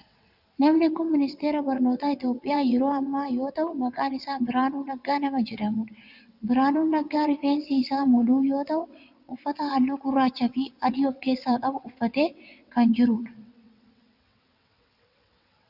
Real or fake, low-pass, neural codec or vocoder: fake; 5.4 kHz; vocoder, 22.05 kHz, 80 mel bands, WaveNeXt